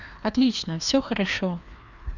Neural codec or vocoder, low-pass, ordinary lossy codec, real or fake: codec, 16 kHz, 2 kbps, FreqCodec, larger model; 7.2 kHz; none; fake